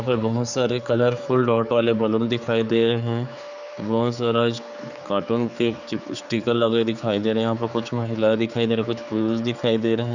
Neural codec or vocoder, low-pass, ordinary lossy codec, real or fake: codec, 16 kHz, 4 kbps, X-Codec, HuBERT features, trained on general audio; 7.2 kHz; none; fake